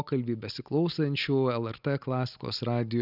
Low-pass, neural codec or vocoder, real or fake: 5.4 kHz; none; real